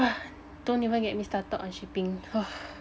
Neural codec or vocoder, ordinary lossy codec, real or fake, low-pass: none; none; real; none